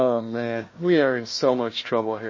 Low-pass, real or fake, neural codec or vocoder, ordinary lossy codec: 7.2 kHz; fake; codec, 16 kHz, 1 kbps, FunCodec, trained on Chinese and English, 50 frames a second; MP3, 32 kbps